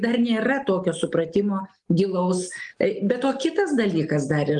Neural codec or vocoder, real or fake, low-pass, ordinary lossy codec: none; real; 10.8 kHz; Opus, 24 kbps